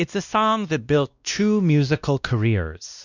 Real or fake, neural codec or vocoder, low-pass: fake; codec, 16 kHz, 1 kbps, X-Codec, WavLM features, trained on Multilingual LibriSpeech; 7.2 kHz